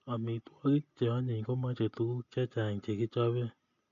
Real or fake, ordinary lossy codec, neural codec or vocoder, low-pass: real; none; none; 7.2 kHz